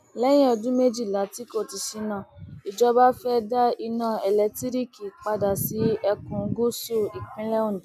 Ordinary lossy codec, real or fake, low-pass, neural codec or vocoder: none; real; 14.4 kHz; none